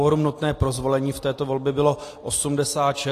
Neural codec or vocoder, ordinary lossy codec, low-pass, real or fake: none; AAC, 48 kbps; 14.4 kHz; real